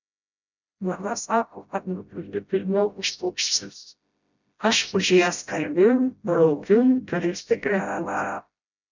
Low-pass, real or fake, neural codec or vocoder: 7.2 kHz; fake; codec, 16 kHz, 0.5 kbps, FreqCodec, smaller model